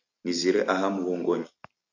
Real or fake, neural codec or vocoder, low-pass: real; none; 7.2 kHz